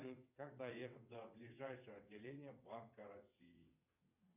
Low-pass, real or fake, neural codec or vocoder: 3.6 kHz; fake; vocoder, 22.05 kHz, 80 mel bands, WaveNeXt